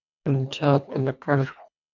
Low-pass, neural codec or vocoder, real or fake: 7.2 kHz; codec, 24 kHz, 1.5 kbps, HILCodec; fake